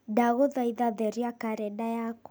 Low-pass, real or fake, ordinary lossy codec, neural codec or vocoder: none; real; none; none